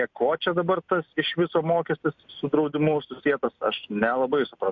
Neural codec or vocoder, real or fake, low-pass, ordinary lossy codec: none; real; 7.2 kHz; MP3, 48 kbps